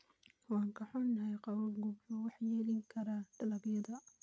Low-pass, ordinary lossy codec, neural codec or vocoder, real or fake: none; none; none; real